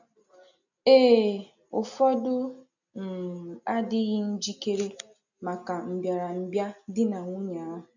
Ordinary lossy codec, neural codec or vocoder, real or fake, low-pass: none; none; real; 7.2 kHz